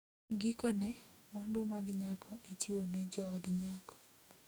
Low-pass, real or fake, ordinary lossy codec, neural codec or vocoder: none; fake; none; codec, 44.1 kHz, 2.6 kbps, DAC